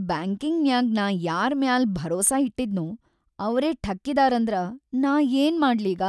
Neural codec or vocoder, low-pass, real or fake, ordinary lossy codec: none; none; real; none